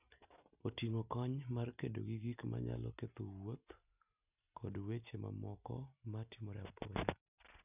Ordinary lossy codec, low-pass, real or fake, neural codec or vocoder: none; 3.6 kHz; real; none